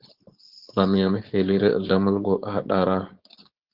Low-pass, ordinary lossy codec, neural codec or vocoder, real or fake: 5.4 kHz; Opus, 16 kbps; codec, 16 kHz, 4.8 kbps, FACodec; fake